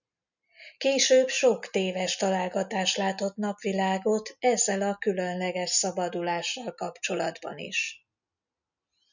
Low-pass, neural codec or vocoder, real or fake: 7.2 kHz; none; real